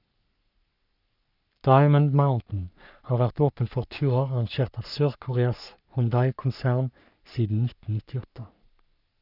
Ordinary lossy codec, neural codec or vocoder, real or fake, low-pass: none; codec, 44.1 kHz, 3.4 kbps, Pupu-Codec; fake; 5.4 kHz